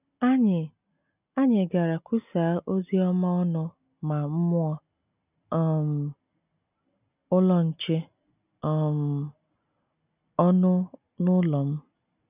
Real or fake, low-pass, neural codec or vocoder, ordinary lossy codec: real; 3.6 kHz; none; none